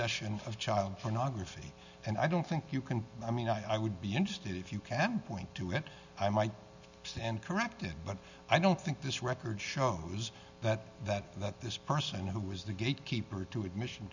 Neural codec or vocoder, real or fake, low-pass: none; real; 7.2 kHz